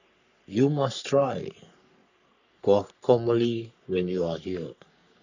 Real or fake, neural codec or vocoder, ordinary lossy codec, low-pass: fake; codec, 44.1 kHz, 3.4 kbps, Pupu-Codec; none; 7.2 kHz